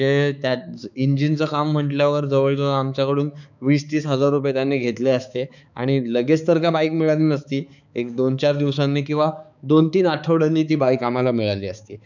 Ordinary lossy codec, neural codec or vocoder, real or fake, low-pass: none; codec, 16 kHz, 4 kbps, X-Codec, HuBERT features, trained on balanced general audio; fake; 7.2 kHz